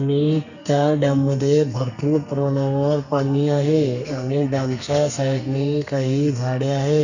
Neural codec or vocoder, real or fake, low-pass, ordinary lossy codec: codec, 32 kHz, 1.9 kbps, SNAC; fake; 7.2 kHz; none